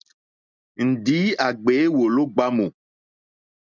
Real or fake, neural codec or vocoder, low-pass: real; none; 7.2 kHz